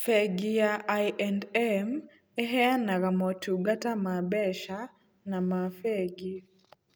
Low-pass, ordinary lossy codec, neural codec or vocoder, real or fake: none; none; none; real